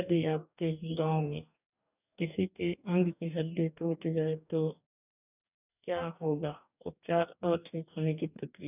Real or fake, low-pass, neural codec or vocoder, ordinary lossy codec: fake; 3.6 kHz; codec, 44.1 kHz, 2.6 kbps, DAC; none